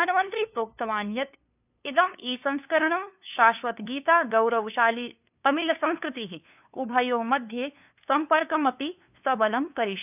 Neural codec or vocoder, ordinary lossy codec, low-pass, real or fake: codec, 16 kHz, 2 kbps, FunCodec, trained on LibriTTS, 25 frames a second; none; 3.6 kHz; fake